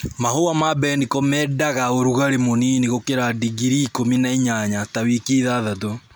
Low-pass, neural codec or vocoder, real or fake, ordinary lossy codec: none; none; real; none